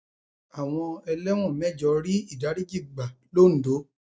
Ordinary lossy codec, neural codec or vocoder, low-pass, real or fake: none; none; none; real